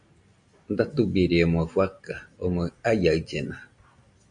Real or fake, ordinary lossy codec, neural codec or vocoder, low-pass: real; MP3, 64 kbps; none; 9.9 kHz